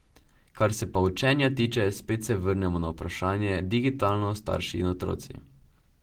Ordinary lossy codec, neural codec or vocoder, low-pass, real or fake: Opus, 16 kbps; none; 19.8 kHz; real